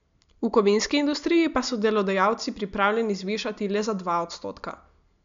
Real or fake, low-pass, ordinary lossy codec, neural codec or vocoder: real; 7.2 kHz; MP3, 64 kbps; none